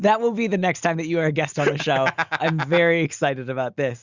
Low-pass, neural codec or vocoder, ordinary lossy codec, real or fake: 7.2 kHz; none; Opus, 64 kbps; real